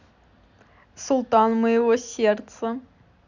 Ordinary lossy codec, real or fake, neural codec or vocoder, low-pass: none; real; none; 7.2 kHz